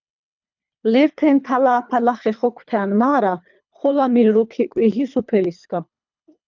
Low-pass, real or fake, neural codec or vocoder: 7.2 kHz; fake; codec, 24 kHz, 3 kbps, HILCodec